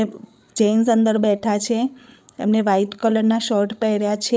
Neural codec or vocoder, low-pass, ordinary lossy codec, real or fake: codec, 16 kHz, 8 kbps, FreqCodec, larger model; none; none; fake